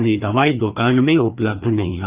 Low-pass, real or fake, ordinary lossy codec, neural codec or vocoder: 3.6 kHz; fake; Opus, 32 kbps; codec, 16 kHz, 2 kbps, FreqCodec, larger model